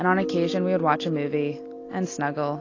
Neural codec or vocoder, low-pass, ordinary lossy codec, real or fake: none; 7.2 kHz; AAC, 32 kbps; real